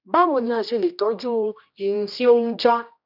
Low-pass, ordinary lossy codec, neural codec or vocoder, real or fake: 5.4 kHz; none; codec, 16 kHz, 1 kbps, X-Codec, HuBERT features, trained on general audio; fake